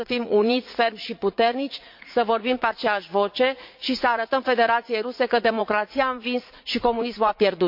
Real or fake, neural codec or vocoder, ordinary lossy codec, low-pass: fake; vocoder, 44.1 kHz, 80 mel bands, Vocos; MP3, 48 kbps; 5.4 kHz